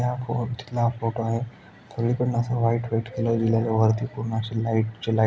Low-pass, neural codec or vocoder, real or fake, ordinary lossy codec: none; none; real; none